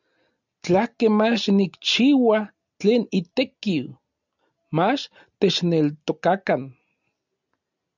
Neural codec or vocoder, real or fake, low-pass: none; real; 7.2 kHz